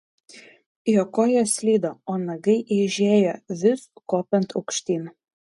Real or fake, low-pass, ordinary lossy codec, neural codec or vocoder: real; 10.8 kHz; MP3, 48 kbps; none